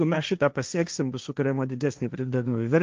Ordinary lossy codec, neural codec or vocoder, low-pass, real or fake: Opus, 24 kbps; codec, 16 kHz, 1.1 kbps, Voila-Tokenizer; 7.2 kHz; fake